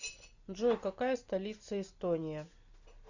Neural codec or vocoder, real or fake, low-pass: none; real; 7.2 kHz